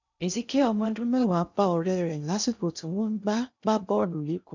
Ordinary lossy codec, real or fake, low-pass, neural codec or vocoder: none; fake; 7.2 kHz; codec, 16 kHz in and 24 kHz out, 0.6 kbps, FocalCodec, streaming, 2048 codes